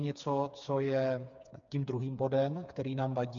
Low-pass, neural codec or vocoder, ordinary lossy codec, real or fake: 7.2 kHz; codec, 16 kHz, 4 kbps, FreqCodec, smaller model; MP3, 64 kbps; fake